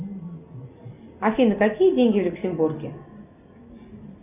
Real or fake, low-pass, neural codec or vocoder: real; 3.6 kHz; none